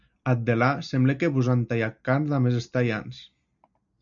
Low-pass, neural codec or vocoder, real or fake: 7.2 kHz; none; real